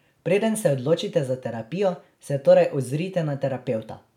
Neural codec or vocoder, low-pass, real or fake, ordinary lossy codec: none; 19.8 kHz; real; none